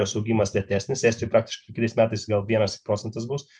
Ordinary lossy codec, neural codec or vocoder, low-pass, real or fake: MP3, 96 kbps; none; 10.8 kHz; real